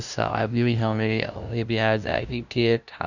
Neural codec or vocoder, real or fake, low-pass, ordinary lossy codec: codec, 16 kHz, 0.5 kbps, FunCodec, trained on LibriTTS, 25 frames a second; fake; 7.2 kHz; none